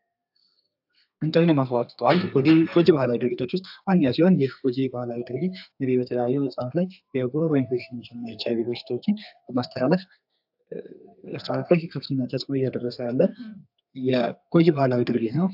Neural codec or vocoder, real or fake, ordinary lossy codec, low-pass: codec, 32 kHz, 1.9 kbps, SNAC; fake; AAC, 48 kbps; 5.4 kHz